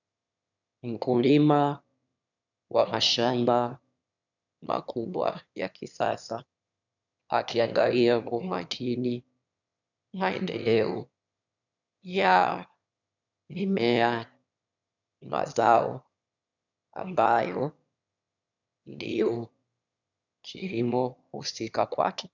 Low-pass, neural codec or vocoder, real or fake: 7.2 kHz; autoencoder, 22.05 kHz, a latent of 192 numbers a frame, VITS, trained on one speaker; fake